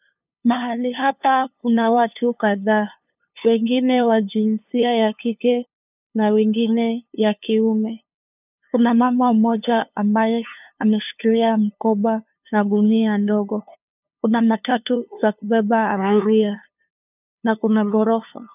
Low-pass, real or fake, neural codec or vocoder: 3.6 kHz; fake; codec, 16 kHz, 2 kbps, FunCodec, trained on LibriTTS, 25 frames a second